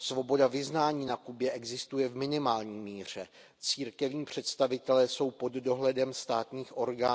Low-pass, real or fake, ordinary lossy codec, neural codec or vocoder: none; real; none; none